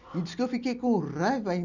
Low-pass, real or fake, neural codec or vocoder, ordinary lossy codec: 7.2 kHz; real; none; none